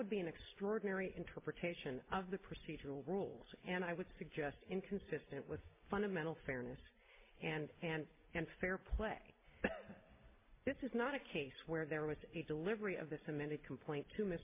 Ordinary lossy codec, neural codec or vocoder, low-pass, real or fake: MP3, 24 kbps; none; 3.6 kHz; real